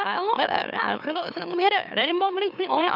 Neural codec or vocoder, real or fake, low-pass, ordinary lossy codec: autoencoder, 44.1 kHz, a latent of 192 numbers a frame, MeloTTS; fake; 5.4 kHz; none